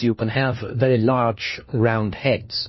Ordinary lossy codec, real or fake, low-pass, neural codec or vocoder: MP3, 24 kbps; fake; 7.2 kHz; codec, 16 kHz, 1 kbps, FunCodec, trained on LibriTTS, 50 frames a second